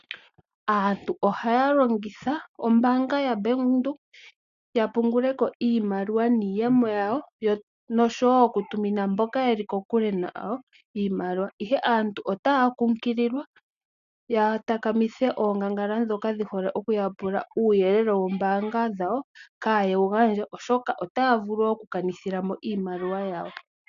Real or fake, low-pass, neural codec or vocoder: real; 7.2 kHz; none